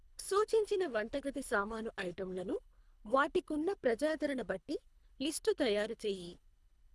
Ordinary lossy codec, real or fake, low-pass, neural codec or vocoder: none; fake; none; codec, 24 kHz, 3 kbps, HILCodec